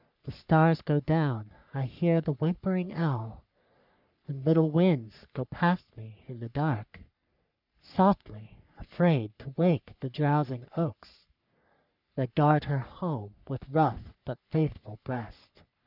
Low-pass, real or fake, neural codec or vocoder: 5.4 kHz; fake; codec, 44.1 kHz, 3.4 kbps, Pupu-Codec